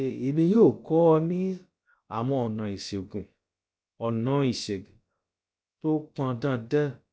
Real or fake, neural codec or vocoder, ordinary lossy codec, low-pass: fake; codec, 16 kHz, about 1 kbps, DyCAST, with the encoder's durations; none; none